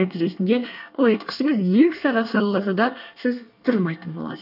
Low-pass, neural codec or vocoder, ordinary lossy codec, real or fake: 5.4 kHz; codec, 24 kHz, 1 kbps, SNAC; none; fake